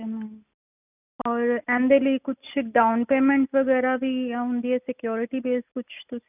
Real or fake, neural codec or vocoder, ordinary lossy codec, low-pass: real; none; none; 3.6 kHz